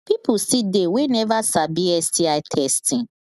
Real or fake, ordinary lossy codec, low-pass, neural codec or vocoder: real; none; 14.4 kHz; none